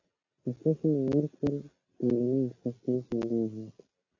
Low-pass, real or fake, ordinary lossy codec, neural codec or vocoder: 7.2 kHz; real; AAC, 32 kbps; none